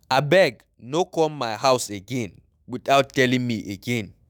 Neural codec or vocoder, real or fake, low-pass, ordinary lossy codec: autoencoder, 48 kHz, 128 numbers a frame, DAC-VAE, trained on Japanese speech; fake; none; none